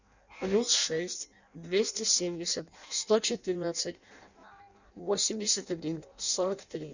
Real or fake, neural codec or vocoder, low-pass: fake; codec, 16 kHz in and 24 kHz out, 0.6 kbps, FireRedTTS-2 codec; 7.2 kHz